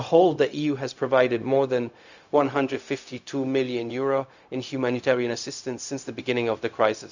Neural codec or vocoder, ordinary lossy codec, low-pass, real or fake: codec, 16 kHz, 0.4 kbps, LongCat-Audio-Codec; none; 7.2 kHz; fake